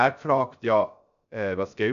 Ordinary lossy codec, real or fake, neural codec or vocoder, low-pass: none; fake; codec, 16 kHz, 0.3 kbps, FocalCodec; 7.2 kHz